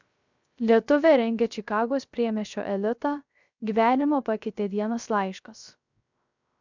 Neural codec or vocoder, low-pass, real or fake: codec, 16 kHz, 0.3 kbps, FocalCodec; 7.2 kHz; fake